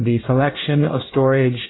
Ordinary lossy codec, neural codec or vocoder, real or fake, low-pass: AAC, 16 kbps; codec, 24 kHz, 1 kbps, SNAC; fake; 7.2 kHz